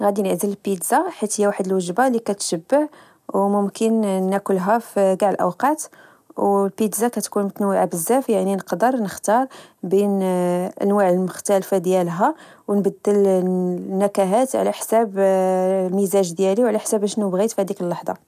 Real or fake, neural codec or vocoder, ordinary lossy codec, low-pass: real; none; none; 14.4 kHz